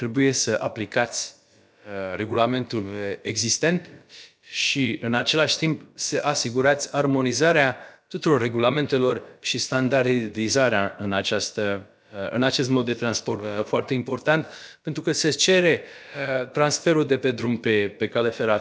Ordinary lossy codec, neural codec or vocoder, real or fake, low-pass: none; codec, 16 kHz, about 1 kbps, DyCAST, with the encoder's durations; fake; none